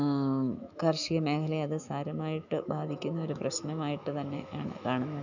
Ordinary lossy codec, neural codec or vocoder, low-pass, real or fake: none; autoencoder, 48 kHz, 128 numbers a frame, DAC-VAE, trained on Japanese speech; 7.2 kHz; fake